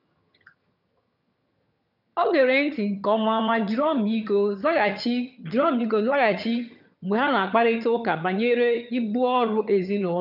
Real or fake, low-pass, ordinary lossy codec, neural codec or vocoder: fake; 5.4 kHz; none; vocoder, 22.05 kHz, 80 mel bands, HiFi-GAN